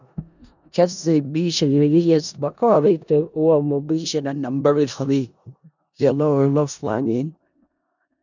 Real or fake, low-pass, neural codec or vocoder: fake; 7.2 kHz; codec, 16 kHz in and 24 kHz out, 0.4 kbps, LongCat-Audio-Codec, four codebook decoder